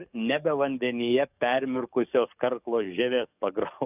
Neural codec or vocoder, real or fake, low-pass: codec, 44.1 kHz, 7.8 kbps, DAC; fake; 3.6 kHz